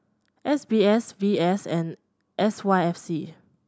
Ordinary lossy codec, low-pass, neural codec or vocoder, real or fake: none; none; none; real